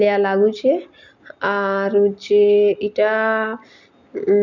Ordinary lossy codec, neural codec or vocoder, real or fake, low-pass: none; none; real; 7.2 kHz